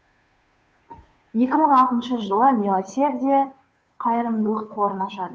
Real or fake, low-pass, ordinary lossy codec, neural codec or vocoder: fake; none; none; codec, 16 kHz, 2 kbps, FunCodec, trained on Chinese and English, 25 frames a second